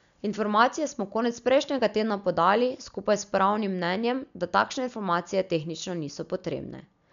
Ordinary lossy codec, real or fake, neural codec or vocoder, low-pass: MP3, 96 kbps; real; none; 7.2 kHz